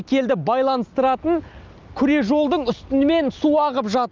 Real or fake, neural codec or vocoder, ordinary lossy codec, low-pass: real; none; Opus, 24 kbps; 7.2 kHz